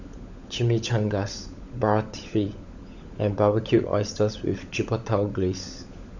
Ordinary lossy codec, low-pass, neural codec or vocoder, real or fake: none; 7.2 kHz; codec, 16 kHz, 16 kbps, FunCodec, trained on LibriTTS, 50 frames a second; fake